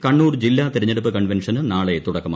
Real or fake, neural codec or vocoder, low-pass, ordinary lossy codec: real; none; none; none